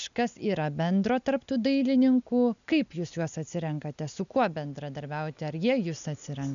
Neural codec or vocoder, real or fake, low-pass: none; real; 7.2 kHz